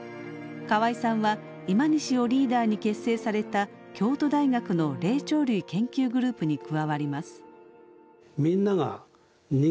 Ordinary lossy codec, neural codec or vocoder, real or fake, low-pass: none; none; real; none